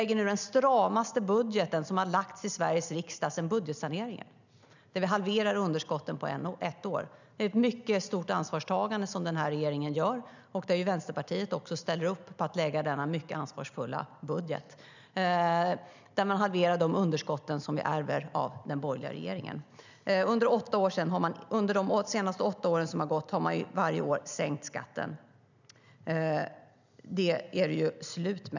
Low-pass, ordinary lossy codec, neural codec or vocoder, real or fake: 7.2 kHz; none; none; real